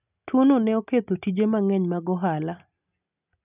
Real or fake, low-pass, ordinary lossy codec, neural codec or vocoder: real; 3.6 kHz; none; none